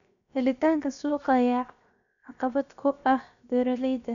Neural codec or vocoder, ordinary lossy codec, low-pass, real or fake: codec, 16 kHz, 0.7 kbps, FocalCodec; none; 7.2 kHz; fake